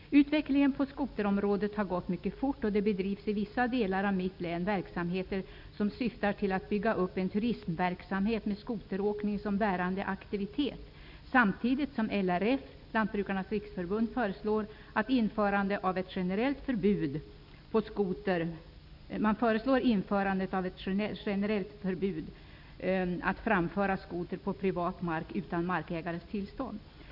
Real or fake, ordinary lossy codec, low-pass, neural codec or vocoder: real; none; 5.4 kHz; none